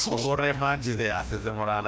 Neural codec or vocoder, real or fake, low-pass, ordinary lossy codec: codec, 16 kHz, 1 kbps, FreqCodec, larger model; fake; none; none